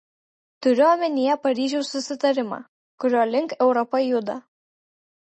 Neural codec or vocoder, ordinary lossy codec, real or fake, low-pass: none; MP3, 32 kbps; real; 10.8 kHz